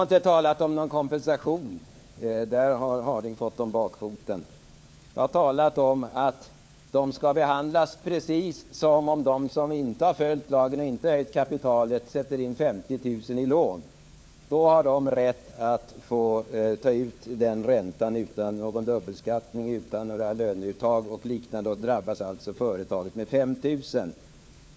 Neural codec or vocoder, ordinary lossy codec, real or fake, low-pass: codec, 16 kHz, 4 kbps, FunCodec, trained on LibriTTS, 50 frames a second; none; fake; none